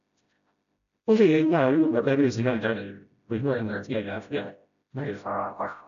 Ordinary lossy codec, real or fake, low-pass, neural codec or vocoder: none; fake; 7.2 kHz; codec, 16 kHz, 0.5 kbps, FreqCodec, smaller model